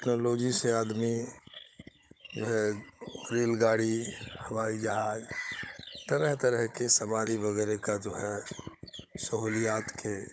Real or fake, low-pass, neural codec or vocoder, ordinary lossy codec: fake; none; codec, 16 kHz, 16 kbps, FunCodec, trained on Chinese and English, 50 frames a second; none